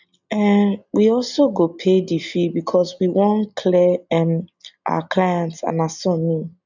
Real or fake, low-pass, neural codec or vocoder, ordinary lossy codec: real; 7.2 kHz; none; none